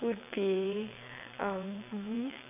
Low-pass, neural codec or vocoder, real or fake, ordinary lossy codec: 3.6 kHz; vocoder, 22.05 kHz, 80 mel bands, WaveNeXt; fake; none